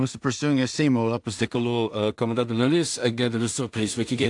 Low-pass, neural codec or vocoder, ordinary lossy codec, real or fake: 10.8 kHz; codec, 16 kHz in and 24 kHz out, 0.4 kbps, LongCat-Audio-Codec, two codebook decoder; AAC, 64 kbps; fake